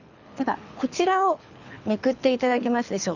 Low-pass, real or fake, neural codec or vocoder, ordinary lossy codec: 7.2 kHz; fake; codec, 24 kHz, 6 kbps, HILCodec; none